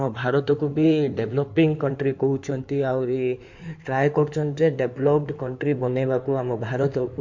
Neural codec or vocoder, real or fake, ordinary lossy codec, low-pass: codec, 16 kHz in and 24 kHz out, 2.2 kbps, FireRedTTS-2 codec; fake; MP3, 48 kbps; 7.2 kHz